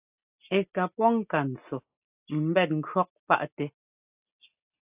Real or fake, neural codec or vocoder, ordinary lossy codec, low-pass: real; none; AAC, 32 kbps; 3.6 kHz